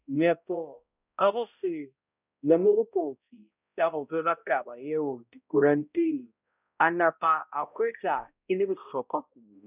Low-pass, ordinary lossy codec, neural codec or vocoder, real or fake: 3.6 kHz; none; codec, 16 kHz, 0.5 kbps, X-Codec, HuBERT features, trained on balanced general audio; fake